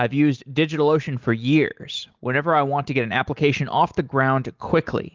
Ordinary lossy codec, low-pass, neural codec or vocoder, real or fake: Opus, 24 kbps; 7.2 kHz; none; real